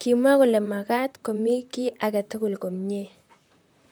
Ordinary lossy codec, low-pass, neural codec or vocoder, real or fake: none; none; vocoder, 44.1 kHz, 128 mel bands, Pupu-Vocoder; fake